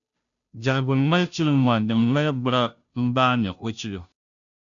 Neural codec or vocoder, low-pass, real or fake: codec, 16 kHz, 0.5 kbps, FunCodec, trained on Chinese and English, 25 frames a second; 7.2 kHz; fake